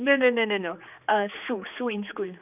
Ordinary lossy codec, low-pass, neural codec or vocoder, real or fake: none; 3.6 kHz; codec, 16 kHz, 4 kbps, X-Codec, HuBERT features, trained on general audio; fake